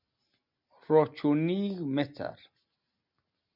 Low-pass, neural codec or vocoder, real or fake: 5.4 kHz; none; real